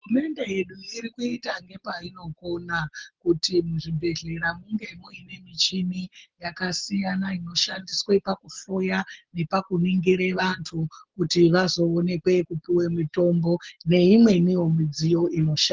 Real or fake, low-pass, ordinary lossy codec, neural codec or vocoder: real; 7.2 kHz; Opus, 16 kbps; none